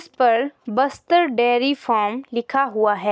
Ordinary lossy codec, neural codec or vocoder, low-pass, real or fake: none; none; none; real